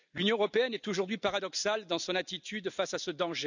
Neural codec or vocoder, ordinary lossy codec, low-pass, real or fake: none; none; 7.2 kHz; real